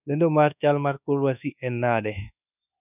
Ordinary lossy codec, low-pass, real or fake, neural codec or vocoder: none; 3.6 kHz; fake; codec, 24 kHz, 1.2 kbps, DualCodec